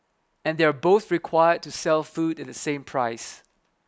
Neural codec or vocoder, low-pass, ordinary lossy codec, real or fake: none; none; none; real